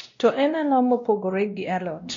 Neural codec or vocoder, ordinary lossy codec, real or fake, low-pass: codec, 16 kHz, 1 kbps, X-Codec, WavLM features, trained on Multilingual LibriSpeech; MP3, 48 kbps; fake; 7.2 kHz